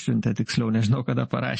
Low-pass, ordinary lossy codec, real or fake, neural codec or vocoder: 10.8 kHz; MP3, 32 kbps; real; none